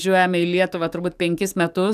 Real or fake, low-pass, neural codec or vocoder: fake; 14.4 kHz; codec, 44.1 kHz, 7.8 kbps, DAC